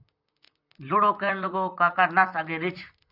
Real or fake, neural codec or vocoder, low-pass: fake; vocoder, 44.1 kHz, 128 mel bands, Pupu-Vocoder; 5.4 kHz